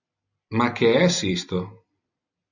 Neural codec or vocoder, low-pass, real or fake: none; 7.2 kHz; real